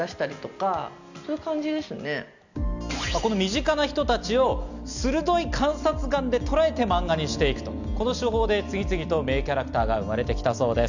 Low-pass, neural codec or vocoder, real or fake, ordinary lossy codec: 7.2 kHz; none; real; none